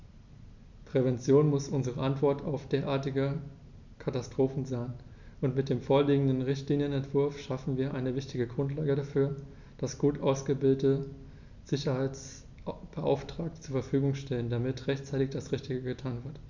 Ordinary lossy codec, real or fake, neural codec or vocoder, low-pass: none; real; none; 7.2 kHz